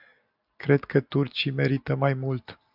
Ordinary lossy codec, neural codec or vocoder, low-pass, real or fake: AAC, 48 kbps; none; 5.4 kHz; real